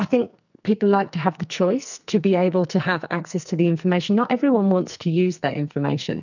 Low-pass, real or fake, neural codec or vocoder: 7.2 kHz; fake; codec, 44.1 kHz, 2.6 kbps, SNAC